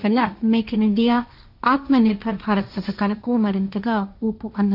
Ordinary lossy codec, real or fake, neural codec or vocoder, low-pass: none; fake; codec, 16 kHz, 1.1 kbps, Voila-Tokenizer; 5.4 kHz